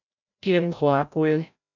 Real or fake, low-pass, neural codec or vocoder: fake; 7.2 kHz; codec, 16 kHz, 0.5 kbps, FreqCodec, larger model